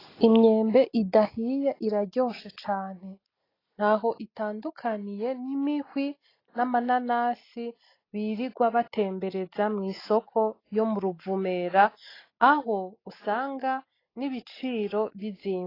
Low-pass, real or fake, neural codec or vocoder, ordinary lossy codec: 5.4 kHz; real; none; AAC, 24 kbps